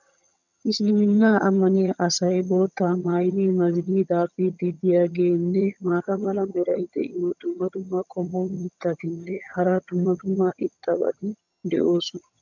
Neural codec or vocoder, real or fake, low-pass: vocoder, 22.05 kHz, 80 mel bands, HiFi-GAN; fake; 7.2 kHz